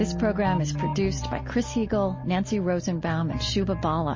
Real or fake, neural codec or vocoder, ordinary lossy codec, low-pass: real; none; MP3, 32 kbps; 7.2 kHz